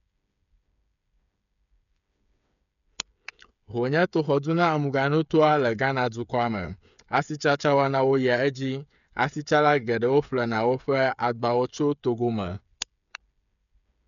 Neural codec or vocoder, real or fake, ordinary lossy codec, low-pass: codec, 16 kHz, 8 kbps, FreqCodec, smaller model; fake; none; 7.2 kHz